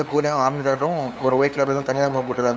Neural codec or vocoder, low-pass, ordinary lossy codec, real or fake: codec, 16 kHz, 2 kbps, FunCodec, trained on LibriTTS, 25 frames a second; none; none; fake